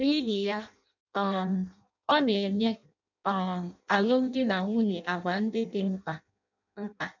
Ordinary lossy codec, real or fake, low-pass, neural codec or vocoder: none; fake; 7.2 kHz; codec, 16 kHz in and 24 kHz out, 0.6 kbps, FireRedTTS-2 codec